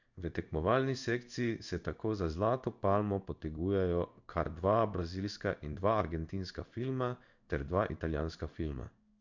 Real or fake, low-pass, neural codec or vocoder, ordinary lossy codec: fake; 7.2 kHz; codec, 16 kHz in and 24 kHz out, 1 kbps, XY-Tokenizer; none